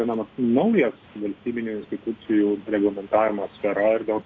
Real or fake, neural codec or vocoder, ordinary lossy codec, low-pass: real; none; AAC, 32 kbps; 7.2 kHz